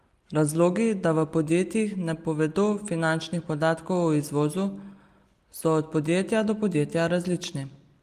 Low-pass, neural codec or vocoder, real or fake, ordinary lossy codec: 14.4 kHz; none; real; Opus, 24 kbps